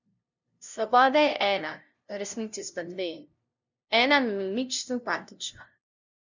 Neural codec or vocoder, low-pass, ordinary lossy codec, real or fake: codec, 16 kHz, 0.5 kbps, FunCodec, trained on LibriTTS, 25 frames a second; 7.2 kHz; none; fake